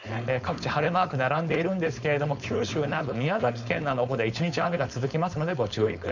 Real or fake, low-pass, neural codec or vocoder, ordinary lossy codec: fake; 7.2 kHz; codec, 16 kHz, 4.8 kbps, FACodec; none